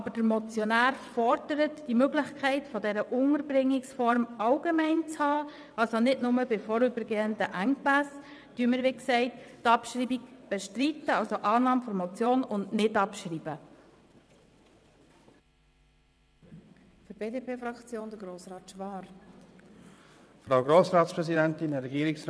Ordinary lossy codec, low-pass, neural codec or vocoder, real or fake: none; none; vocoder, 22.05 kHz, 80 mel bands, WaveNeXt; fake